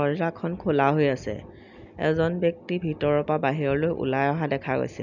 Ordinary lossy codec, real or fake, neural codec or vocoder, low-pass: none; real; none; 7.2 kHz